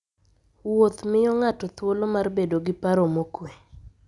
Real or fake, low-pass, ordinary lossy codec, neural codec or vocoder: real; 10.8 kHz; none; none